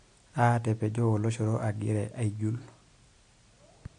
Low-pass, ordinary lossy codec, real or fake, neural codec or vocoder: 9.9 kHz; MP3, 48 kbps; real; none